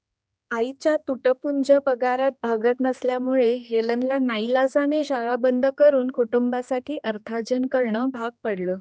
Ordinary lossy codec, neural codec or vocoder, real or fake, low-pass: none; codec, 16 kHz, 2 kbps, X-Codec, HuBERT features, trained on general audio; fake; none